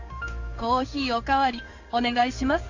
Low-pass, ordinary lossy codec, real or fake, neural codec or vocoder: 7.2 kHz; AAC, 48 kbps; fake; codec, 16 kHz in and 24 kHz out, 1 kbps, XY-Tokenizer